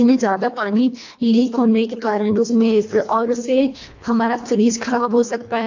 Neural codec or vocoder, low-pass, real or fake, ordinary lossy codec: codec, 24 kHz, 1.5 kbps, HILCodec; 7.2 kHz; fake; AAC, 48 kbps